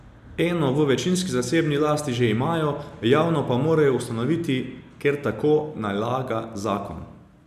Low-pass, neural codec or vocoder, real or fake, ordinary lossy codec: 14.4 kHz; none; real; none